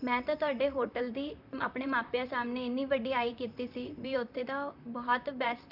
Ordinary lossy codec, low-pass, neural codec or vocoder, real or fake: none; 5.4 kHz; vocoder, 44.1 kHz, 128 mel bands, Pupu-Vocoder; fake